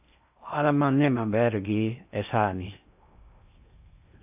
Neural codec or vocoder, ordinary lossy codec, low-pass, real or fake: codec, 16 kHz in and 24 kHz out, 0.6 kbps, FocalCodec, streaming, 4096 codes; AAC, 32 kbps; 3.6 kHz; fake